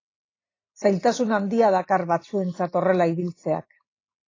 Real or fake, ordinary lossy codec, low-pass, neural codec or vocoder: real; AAC, 32 kbps; 7.2 kHz; none